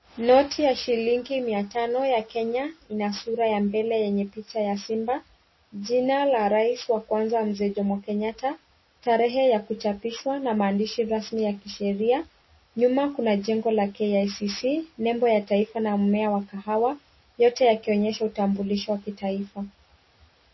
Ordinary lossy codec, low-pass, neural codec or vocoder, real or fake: MP3, 24 kbps; 7.2 kHz; none; real